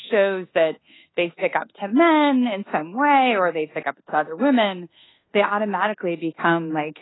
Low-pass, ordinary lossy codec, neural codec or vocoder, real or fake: 7.2 kHz; AAC, 16 kbps; codec, 24 kHz, 1.2 kbps, DualCodec; fake